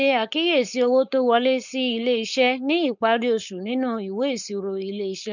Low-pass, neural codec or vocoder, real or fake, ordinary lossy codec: 7.2 kHz; codec, 16 kHz, 4.8 kbps, FACodec; fake; none